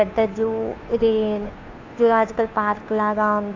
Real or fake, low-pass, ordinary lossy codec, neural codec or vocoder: fake; 7.2 kHz; AAC, 48 kbps; codec, 16 kHz, 2 kbps, FunCodec, trained on Chinese and English, 25 frames a second